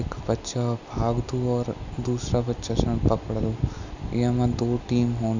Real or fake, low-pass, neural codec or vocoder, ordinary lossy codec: real; 7.2 kHz; none; none